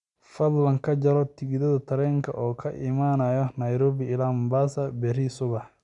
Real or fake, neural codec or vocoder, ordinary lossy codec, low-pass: real; none; none; 10.8 kHz